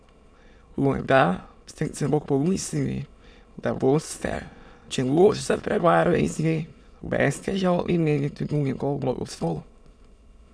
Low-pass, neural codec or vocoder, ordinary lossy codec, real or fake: none; autoencoder, 22.05 kHz, a latent of 192 numbers a frame, VITS, trained on many speakers; none; fake